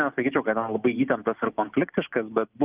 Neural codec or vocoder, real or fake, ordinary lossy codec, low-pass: none; real; Opus, 24 kbps; 3.6 kHz